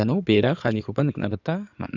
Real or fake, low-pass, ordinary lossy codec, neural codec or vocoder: fake; 7.2 kHz; none; codec, 16 kHz in and 24 kHz out, 2.2 kbps, FireRedTTS-2 codec